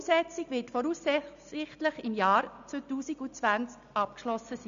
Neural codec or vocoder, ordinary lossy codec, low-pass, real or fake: none; MP3, 64 kbps; 7.2 kHz; real